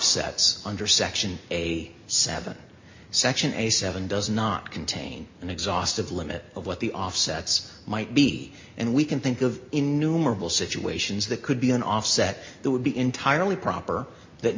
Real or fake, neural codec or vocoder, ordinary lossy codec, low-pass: real; none; MP3, 48 kbps; 7.2 kHz